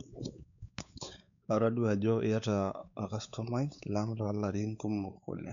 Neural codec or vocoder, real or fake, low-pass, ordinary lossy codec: codec, 16 kHz, 4 kbps, X-Codec, WavLM features, trained on Multilingual LibriSpeech; fake; 7.2 kHz; none